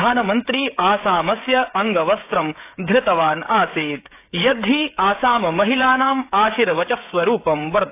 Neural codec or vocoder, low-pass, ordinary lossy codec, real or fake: codec, 16 kHz, 16 kbps, FreqCodec, smaller model; 3.6 kHz; AAC, 24 kbps; fake